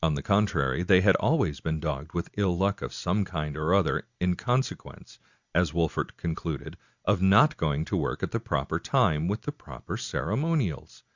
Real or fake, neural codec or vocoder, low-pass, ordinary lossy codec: real; none; 7.2 kHz; Opus, 64 kbps